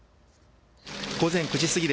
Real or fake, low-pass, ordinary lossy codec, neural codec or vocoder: real; none; none; none